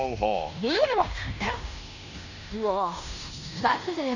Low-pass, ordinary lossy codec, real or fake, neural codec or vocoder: 7.2 kHz; none; fake; codec, 16 kHz in and 24 kHz out, 0.9 kbps, LongCat-Audio-Codec, fine tuned four codebook decoder